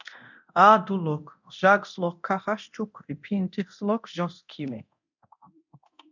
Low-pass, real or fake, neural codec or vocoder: 7.2 kHz; fake; codec, 24 kHz, 0.9 kbps, DualCodec